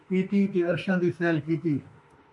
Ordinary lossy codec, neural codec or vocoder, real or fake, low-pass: MP3, 48 kbps; autoencoder, 48 kHz, 32 numbers a frame, DAC-VAE, trained on Japanese speech; fake; 10.8 kHz